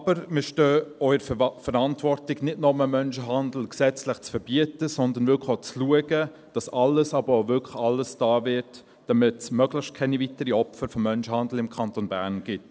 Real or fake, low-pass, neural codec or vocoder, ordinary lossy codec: real; none; none; none